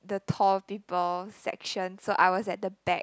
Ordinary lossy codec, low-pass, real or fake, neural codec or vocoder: none; none; real; none